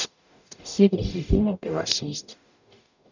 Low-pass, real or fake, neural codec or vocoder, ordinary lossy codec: 7.2 kHz; fake; codec, 44.1 kHz, 0.9 kbps, DAC; none